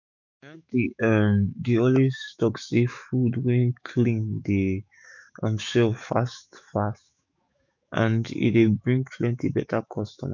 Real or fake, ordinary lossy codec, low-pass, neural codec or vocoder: fake; none; 7.2 kHz; autoencoder, 48 kHz, 128 numbers a frame, DAC-VAE, trained on Japanese speech